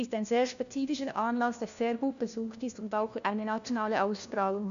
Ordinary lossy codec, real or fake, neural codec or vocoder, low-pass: none; fake; codec, 16 kHz, 1 kbps, FunCodec, trained on LibriTTS, 50 frames a second; 7.2 kHz